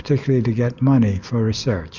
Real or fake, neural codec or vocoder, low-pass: real; none; 7.2 kHz